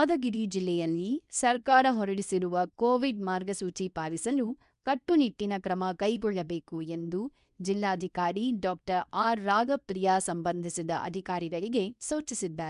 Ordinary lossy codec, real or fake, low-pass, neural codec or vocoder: none; fake; 10.8 kHz; codec, 24 kHz, 0.9 kbps, WavTokenizer, medium speech release version 2